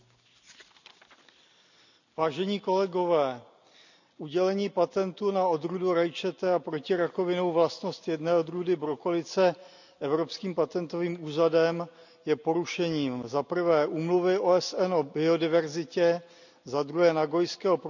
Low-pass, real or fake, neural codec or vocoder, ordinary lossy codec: 7.2 kHz; real; none; none